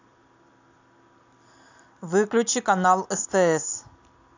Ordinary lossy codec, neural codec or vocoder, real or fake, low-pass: AAC, 48 kbps; none; real; 7.2 kHz